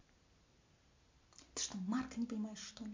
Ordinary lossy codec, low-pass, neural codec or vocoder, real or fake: MP3, 48 kbps; 7.2 kHz; none; real